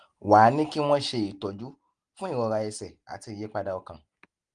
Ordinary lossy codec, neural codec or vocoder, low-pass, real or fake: Opus, 24 kbps; none; 9.9 kHz; real